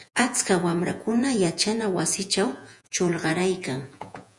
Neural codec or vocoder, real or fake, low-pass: vocoder, 48 kHz, 128 mel bands, Vocos; fake; 10.8 kHz